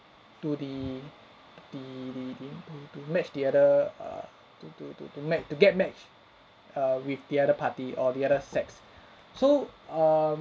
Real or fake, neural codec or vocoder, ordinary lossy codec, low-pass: real; none; none; none